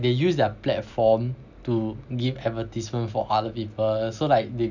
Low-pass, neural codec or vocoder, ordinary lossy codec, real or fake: 7.2 kHz; none; none; real